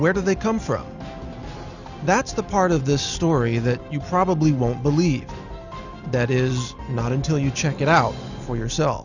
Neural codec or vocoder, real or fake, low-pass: none; real; 7.2 kHz